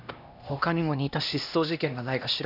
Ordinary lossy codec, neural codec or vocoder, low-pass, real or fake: none; codec, 16 kHz, 1 kbps, X-Codec, HuBERT features, trained on LibriSpeech; 5.4 kHz; fake